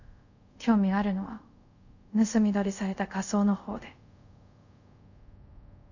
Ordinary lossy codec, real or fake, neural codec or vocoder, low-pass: none; fake; codec, 24 kHz, 0.5 kbps, DualCodec; 7.2 kHz